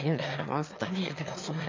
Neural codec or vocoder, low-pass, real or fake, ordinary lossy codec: autoencoder, 22.05 kHz, a latent of 192 numbers a frame, VITS, trained on one speaker; 7.2 kHz; fake; MP3, 64 kbps